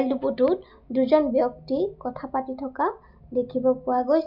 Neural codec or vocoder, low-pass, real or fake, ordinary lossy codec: none; 5.4 kHz; real; none